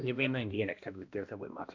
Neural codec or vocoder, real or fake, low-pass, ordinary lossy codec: codec, 16 kHz, 1 kbps, X-Codec, HuBERT features, trained on balanced general audio; fake; 7.2 kHz; AAC, 48 kbps